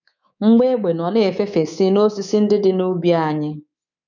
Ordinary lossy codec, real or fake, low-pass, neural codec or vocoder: none; fake; 7.2 kHz; codec, 24 kHz, 3.1 kbps, DualCodec